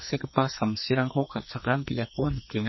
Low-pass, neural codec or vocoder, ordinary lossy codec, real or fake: 7.2 kHz; codec, 44.1 kHz, 2.6 kbps, SNAC; MP3, 24 kbps; fake